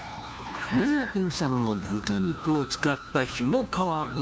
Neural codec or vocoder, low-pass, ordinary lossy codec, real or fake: codec, 16 kHz, 1 kbps, FunCodec, trained on LibriTTS, 50 frames a second; none; none; fake